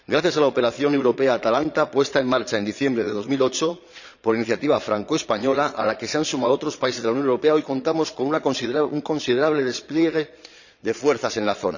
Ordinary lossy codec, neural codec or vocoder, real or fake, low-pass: none; vocoder, 22.05 kHz, 80 mel bands, Vocos; fake; 7.2 kHz